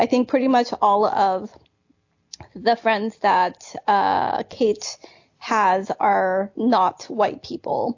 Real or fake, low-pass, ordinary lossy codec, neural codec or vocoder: real; 7.2 kHz; AAC, 48 kbps; none